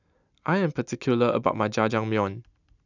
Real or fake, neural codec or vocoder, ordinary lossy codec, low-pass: real; none; none; 7.2 kHz